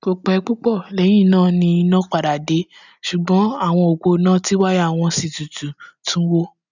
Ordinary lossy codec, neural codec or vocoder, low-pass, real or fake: none; none; 7.2 kHz; real